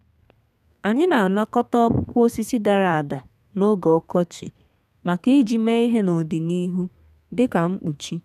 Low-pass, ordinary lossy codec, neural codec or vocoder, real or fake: 14.4 kHz; none; codec, 32 kHz, 1.9 kbps, SNAC; fake